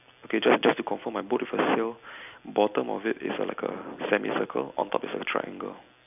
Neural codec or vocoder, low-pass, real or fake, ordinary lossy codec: none; 3.6 kHz; real; none